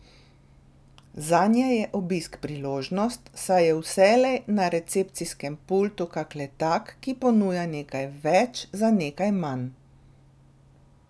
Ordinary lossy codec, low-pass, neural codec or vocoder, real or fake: none; none; none; real